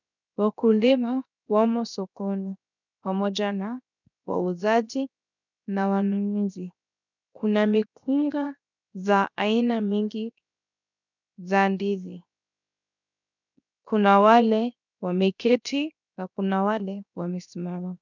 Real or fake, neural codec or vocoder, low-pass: fake; codec, 16 kHz, 0.7 kbps, FocalCodec; 7.2 kHz